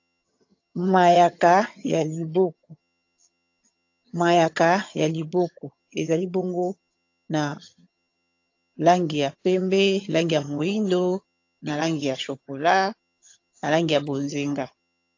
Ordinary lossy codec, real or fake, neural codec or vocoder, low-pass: AAC, 48 kbps; fake; vocoder, 22.05 kHz, 80 mel bands, HiFi-GAN; 7.2 kHz